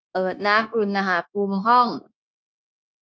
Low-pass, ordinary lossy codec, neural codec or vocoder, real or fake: none; none; codec, 16 kHz, 0.9 kbps, LongCat-Audio-Codec; fake